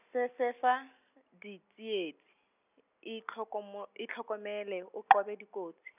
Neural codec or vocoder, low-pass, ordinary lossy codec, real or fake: none; 3.6 kHz; none; real